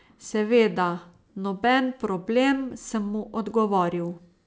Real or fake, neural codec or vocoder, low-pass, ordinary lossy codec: real; none; none; none